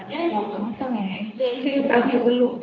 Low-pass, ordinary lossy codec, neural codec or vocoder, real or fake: 7.2 kHz; none; codec, 24 kHz, 0.9 kbps, WavTokenizer, medium speech release version 2; fake